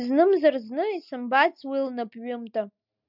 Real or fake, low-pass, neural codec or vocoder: real; 5.4 kHz; none